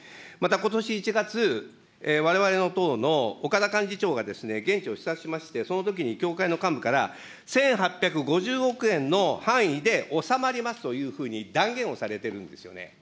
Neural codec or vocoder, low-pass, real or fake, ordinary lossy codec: none; none; real; none